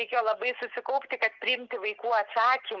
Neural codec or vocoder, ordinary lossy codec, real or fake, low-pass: none; Opus, 32 kbps; real; 7.2 kHz